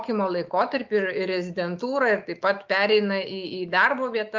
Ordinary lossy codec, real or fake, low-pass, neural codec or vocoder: Opus, 24 kbps; fake; 7.2 kHz; autoencoder, 48 kHz, 128 numbers a frame, DAC-VAE, trained on Japanese speech